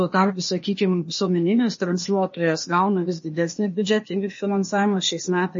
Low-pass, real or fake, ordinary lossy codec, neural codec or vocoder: 7.2 kHz; fake; MP3, 32 kbps; codec, 16 kHz, 0.8 kbps, ZipCodec